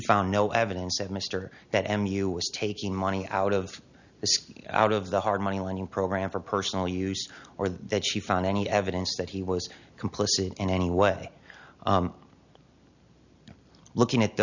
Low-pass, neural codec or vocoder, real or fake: 7.2 kHz; none; real